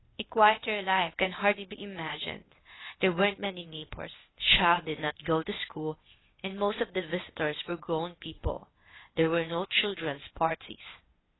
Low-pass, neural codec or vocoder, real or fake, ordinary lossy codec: 7.2 kHz; codec, 16 kHz, 0.8 kbps, ZipCodec; fake; AAC, 16 kbps